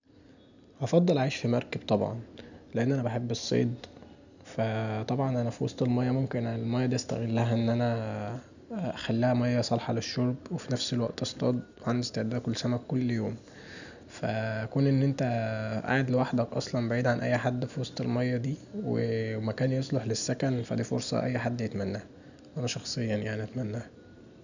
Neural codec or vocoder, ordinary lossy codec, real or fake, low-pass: none; none; real; 7.2 kHz